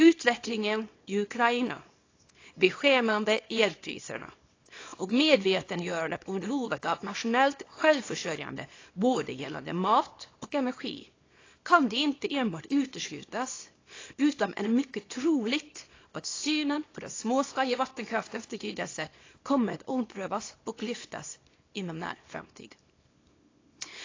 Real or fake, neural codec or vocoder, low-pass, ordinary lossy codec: fake; codec, 24 kHz, 0.9 kbps, WavTokenizer, small release; 7.2 kHz; AAC, 32 kbps